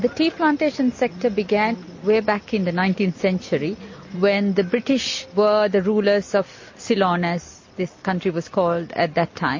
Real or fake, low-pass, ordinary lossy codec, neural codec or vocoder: real; 7.2 kHz; MP3, 32 kbps; none